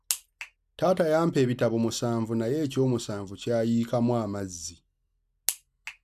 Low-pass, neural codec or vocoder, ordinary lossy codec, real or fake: 14.4 kHz; none; none; real